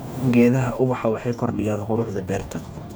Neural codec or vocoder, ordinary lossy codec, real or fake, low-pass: codec, 44.1 kHz, 2.6 kbps, DAC; none; fake; none